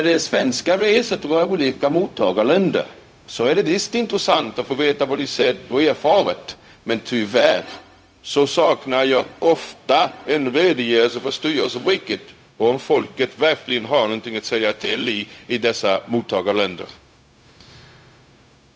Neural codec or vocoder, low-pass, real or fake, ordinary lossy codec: codec, 16 kHz, 0.4 kbps, LongCat-Audio-Codec; none; fake; none